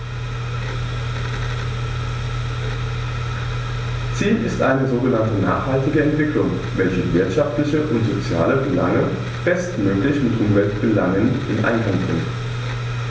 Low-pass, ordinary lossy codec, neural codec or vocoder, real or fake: none; none; none; real